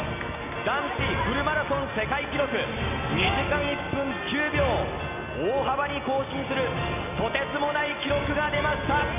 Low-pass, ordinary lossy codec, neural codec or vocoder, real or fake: 3.6 kHz; none; none; real